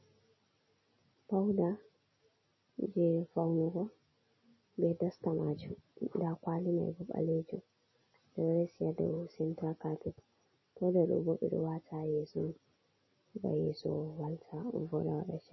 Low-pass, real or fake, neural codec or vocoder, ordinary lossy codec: 7.2 kHz; real; none; MP3, 24 kbps